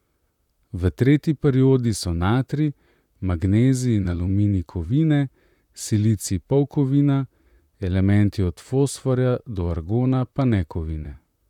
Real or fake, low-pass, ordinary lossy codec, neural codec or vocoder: fake; 19.8 kHz; none; vocoder, 44.1 kHz, 128 mel bands, Pupu-Vocoder